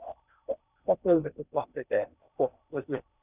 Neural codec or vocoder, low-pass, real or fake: codec, 16 kHz in and 24 kHz out, 0.8 kbps, FocalCodec, streaming, 65536 codes; 3.6 kHz; fake